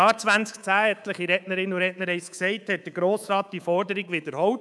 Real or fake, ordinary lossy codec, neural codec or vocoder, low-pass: fake; none; codec, 24 kHz, 3.1 kbps, DualCodec; none